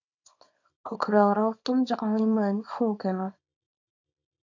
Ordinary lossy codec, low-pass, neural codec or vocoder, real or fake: AAC, 48 kbps; 7.2 kHz; codec, 24 kHz, 0.9 kbps, WavTokenizer, small release; fake